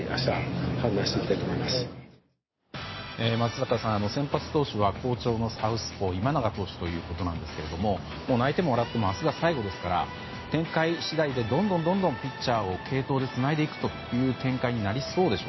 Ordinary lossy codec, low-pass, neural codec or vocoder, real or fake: MP3, 24 kbps; 7.2 kHz; none; real